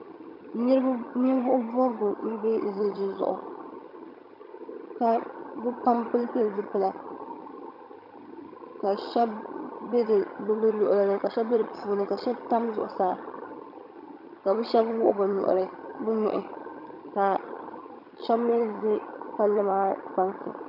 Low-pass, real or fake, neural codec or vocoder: 5.4 kHz; fake; vocoder, 22.05 kHz, 80 mel bands, HiFi-GAN